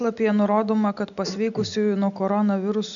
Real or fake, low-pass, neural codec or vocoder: real; 7.2 kHz; none